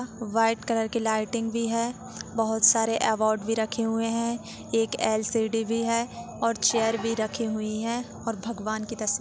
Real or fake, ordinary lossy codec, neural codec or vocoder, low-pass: real; none; none; none